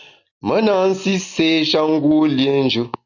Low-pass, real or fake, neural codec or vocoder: 7.2 kHz; real; none